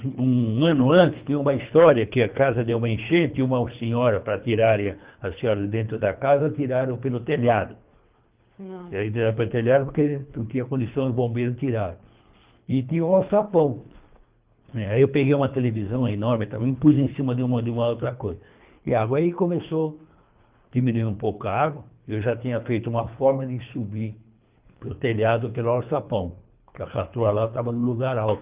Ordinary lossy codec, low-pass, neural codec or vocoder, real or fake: Opus, 32 kbps; 3.6 kHz; codec, 24 kHz, 3 kbps, HILCodec; fake